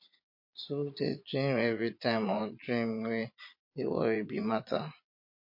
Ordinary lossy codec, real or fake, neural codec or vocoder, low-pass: MP3, 32 kbps; fake; vocoder, 22.05 kHz, 80 mel bands, Vocos; 5.4 kHz